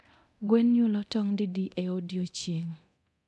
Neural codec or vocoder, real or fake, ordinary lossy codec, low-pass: codec, 24 kHz, 0.9 kbps, DualCodec; fake; none; none